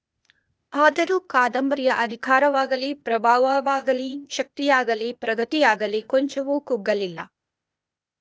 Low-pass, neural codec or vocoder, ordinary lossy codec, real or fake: none; codec, 16 kHz, 0.8 kbps, ZipCodec; none; fake